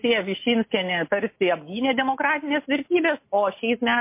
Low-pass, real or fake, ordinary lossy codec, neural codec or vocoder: 3.6 kHz; real; MP3, 24 kbps; none